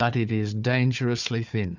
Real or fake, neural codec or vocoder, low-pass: fake; codec, 16 kHz, 4 kbps, FunCodec, trained on Chinese and English, 50 frames a second; 7.2 kHz